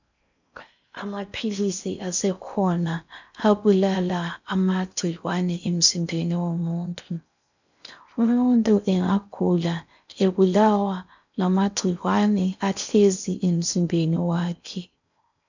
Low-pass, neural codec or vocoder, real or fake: 7.2 kHz; codec, 16 kHz in and 24 kHz out, 0.6 kbps, FocalCodec, streaming, 4096 codes; fake